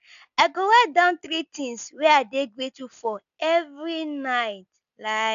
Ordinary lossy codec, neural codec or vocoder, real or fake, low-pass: none; none; real; 7.2 kHz